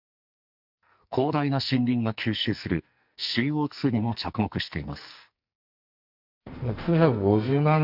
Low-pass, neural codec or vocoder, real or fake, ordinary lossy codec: 5.4 kHz; codec, 44.1 kHz, 2.6 kbps, SNAC; fake; none